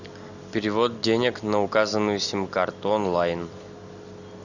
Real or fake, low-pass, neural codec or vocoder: real; 7.2 kHz; none